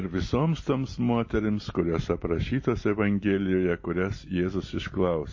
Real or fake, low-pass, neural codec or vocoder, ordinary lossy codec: real; 7.2 kHz; none; MP3, 32 kbps